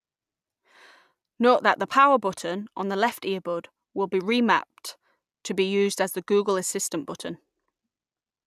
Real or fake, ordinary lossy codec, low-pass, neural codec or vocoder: real; none; 14.4 kHz; none